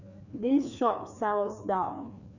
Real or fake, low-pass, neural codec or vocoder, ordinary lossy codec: fake; 7.2 kHz; codec, 16 kHz, 2 kbps, FreqCodec, larger model; none